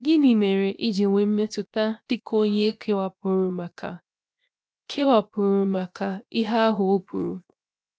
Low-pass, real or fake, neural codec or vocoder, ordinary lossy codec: none; fake; codec, 16 kHz, 0.7 kbps, FocalCodec; none